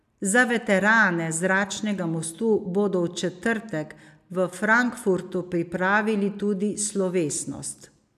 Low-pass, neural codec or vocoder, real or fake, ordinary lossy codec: 14.4 kHz; none; real; none